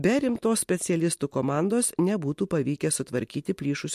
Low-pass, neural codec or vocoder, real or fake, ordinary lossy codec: 14.4 kHz; none; real; MP3, 64 kbps